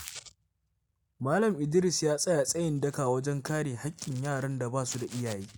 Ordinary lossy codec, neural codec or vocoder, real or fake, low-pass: none; none; real; none